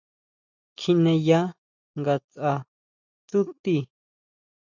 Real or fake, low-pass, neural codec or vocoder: real; 7.2 kHz; none